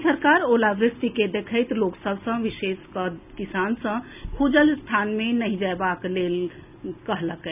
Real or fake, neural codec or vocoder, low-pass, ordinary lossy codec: real; none; 3.6 kHz; none